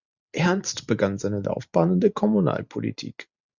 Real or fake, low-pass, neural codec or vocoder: real; 7.2 kHz; none